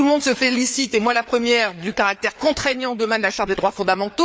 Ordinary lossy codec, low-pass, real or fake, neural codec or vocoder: none; none; fake; codec, 16 kHz, 4 kbps, FreqCodec, larger model